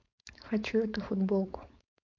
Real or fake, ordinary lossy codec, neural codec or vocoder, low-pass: fake; MP3, 48 kbps; codec, 16 kHz, 4.8 kbps, FACodec; 7.2 kHz